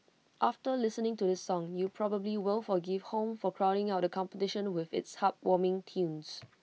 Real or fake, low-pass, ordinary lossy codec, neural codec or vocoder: real; none; none; none